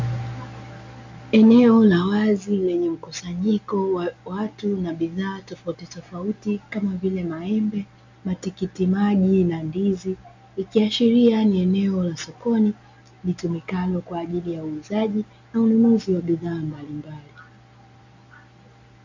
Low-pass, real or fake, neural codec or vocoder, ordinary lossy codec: 7.2 kHz; real; none; MP3, 64 kbps